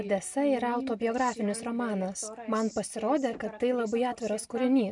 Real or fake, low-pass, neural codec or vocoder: real; 10.8 kHz; none